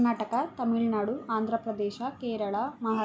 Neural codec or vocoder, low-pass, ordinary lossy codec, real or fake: none; none; none; real